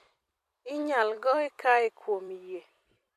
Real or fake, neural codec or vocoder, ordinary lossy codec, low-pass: fake; vocoder, 44.1 kHz, 128 mel bands every 256 samples, BigVGAN v2; MP3, 64 kbps; 14.4 kHz